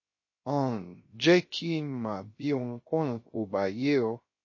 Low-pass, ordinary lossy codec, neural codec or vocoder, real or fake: 7.2 kHz; MP3, 32 kbps; codec, 16 kHz, 0.3 kbps, FocalCodec; fake